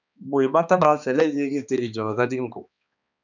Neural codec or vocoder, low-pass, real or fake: codec, 16 kHz, 2 kbps, X-Codec, HuBERT features, trained on balanced general audio; 7.2 kHz; fake